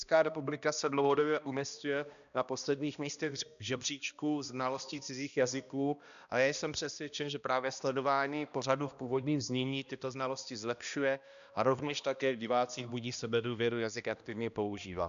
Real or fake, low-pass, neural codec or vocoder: fake; 7.2 kHz; codec, 16 kHz, 1 kbps, X-Codec, HuBERT features, trained on balanced general audio